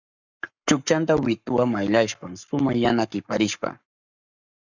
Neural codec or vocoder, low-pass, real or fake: codec, 44.1 kHz, 7.8 kbps, Pupu-Codec; 7.2 kHz; fake